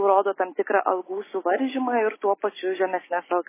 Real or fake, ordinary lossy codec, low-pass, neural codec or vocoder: real; MP3, 16 kbps; 3.6 kHz; none